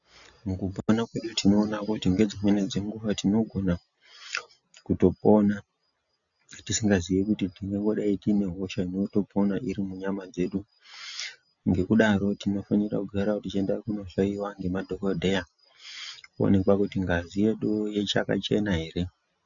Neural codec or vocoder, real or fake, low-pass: none; real; 7.2 kHz